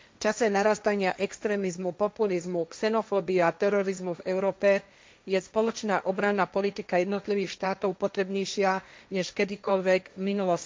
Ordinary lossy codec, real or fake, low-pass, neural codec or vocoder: none; fake; none; codec, 16 kHz, 1.1 kbps, Voila-Tokenizer